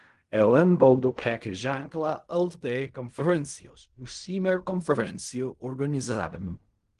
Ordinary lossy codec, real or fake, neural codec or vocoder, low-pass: Opus, 24 kbps; fake; codec, 16 kHz in and 24 kHz out, 0.4 kbps, LongCat-Audio-Codec, fine tuned four codebook decoder; 10.8 kHz